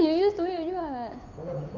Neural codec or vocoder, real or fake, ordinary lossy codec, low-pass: codec, 16 kHz, 8 kbps, FunCodec, trained on Chinese and English, 25 frames a second; fake; MP3, 64 kbps; 7.2 kHz